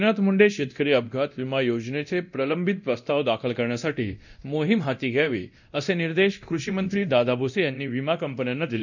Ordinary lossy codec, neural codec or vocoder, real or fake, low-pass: none; codec, 24 kHz, 0.9 kbps, DualCodec; fake; 7.2 kHz